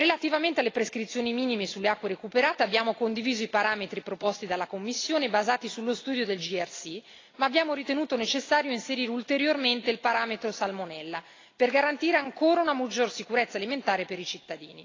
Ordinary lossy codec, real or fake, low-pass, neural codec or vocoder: AAC, 32 kbps; real; 7.2 kHz; none